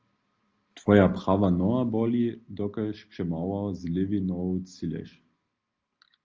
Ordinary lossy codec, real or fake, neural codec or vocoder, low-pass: Opus, 24 kbps; real; none; 7.2 kHz